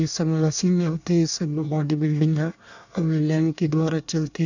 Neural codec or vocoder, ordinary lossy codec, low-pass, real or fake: codec, 24 kHz, 1 kbps, SNAC; none; 7.2 kHz; fake